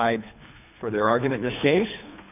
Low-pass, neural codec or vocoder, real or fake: 3.6 kHz; codec, 24 kHz, 3 kbps, HILCodec; fake